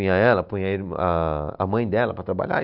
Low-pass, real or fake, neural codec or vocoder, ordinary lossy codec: 5.4 kHz; real; none; none